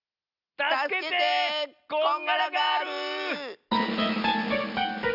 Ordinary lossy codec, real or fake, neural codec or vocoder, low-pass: none; real; none; 5.4 kHz